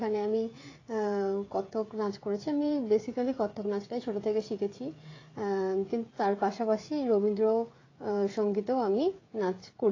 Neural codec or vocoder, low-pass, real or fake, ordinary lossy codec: codec, 16 kHz, 16 kbps, FreqCodec, smaller model; 7.2 kHz; fake; AAC, 32 kbps